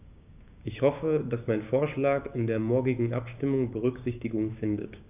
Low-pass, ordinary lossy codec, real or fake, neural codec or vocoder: 3.6 kHz; none; fake; codec, 16 kHz, 6 kbps, DAC